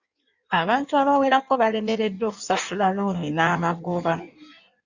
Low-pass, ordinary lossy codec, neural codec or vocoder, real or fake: 7.2 kHz; Opus, 64 kbps; codec, 16 kHz in and 24 kHz out, 1.1 kbps, FireRedTTS-2 codec; fake